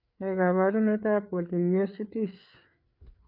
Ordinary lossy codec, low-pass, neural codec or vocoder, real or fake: none; 5.4 kHz; codec, 16 kHz, 4 kbps, FreqCodec, larger model; fake